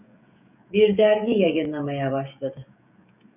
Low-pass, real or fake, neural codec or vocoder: 3.6 kHz; fake; codec, 16 kHz, 16 kbps, FreqCodec, smaller model